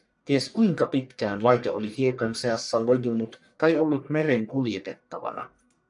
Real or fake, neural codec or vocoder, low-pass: fake; codec, 44.1 kHz, 1.7 kbps, Pupu-Codec; 10.8 kHz